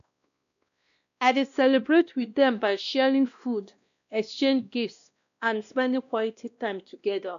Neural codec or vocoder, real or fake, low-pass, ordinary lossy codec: codec, 16 kHz, 1 kbps, X-Codec, WavLM features, trained on Multilingual LibriSpeech; fake; 7.2 kHz; none